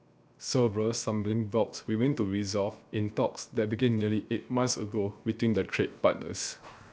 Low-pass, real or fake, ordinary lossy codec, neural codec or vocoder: none; fake; none; codec, 16 kHz, 0.7 kbps, FocalCodec